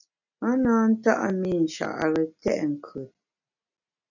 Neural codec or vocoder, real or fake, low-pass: none; real; 7.2 kHz